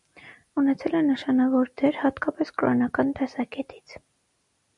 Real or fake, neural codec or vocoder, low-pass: real; none; 10.8 kHz